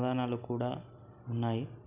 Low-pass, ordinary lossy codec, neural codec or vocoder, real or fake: 3.6 kHz; none; none; real